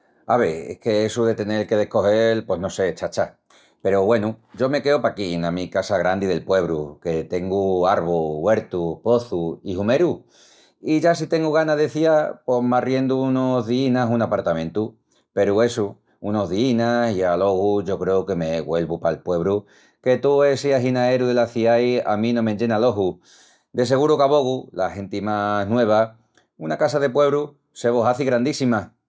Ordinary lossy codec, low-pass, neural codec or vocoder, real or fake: none; none; none; real